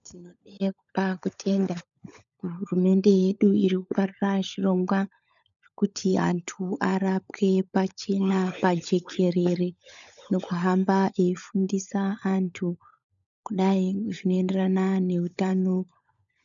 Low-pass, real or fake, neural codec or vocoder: 7.2 kHz; fake; codec, 16 kHz, 16 kbps, FunCodec, trained on LibriTTS, 50 frames a second